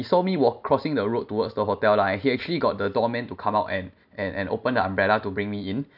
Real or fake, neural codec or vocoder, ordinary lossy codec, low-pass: real; none; none; 5.4 kHz